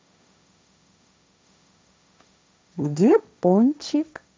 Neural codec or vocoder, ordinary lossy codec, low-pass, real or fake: codec, 16 kHz, 1.1 kbps, Voila-Tokenizer; none; none; fake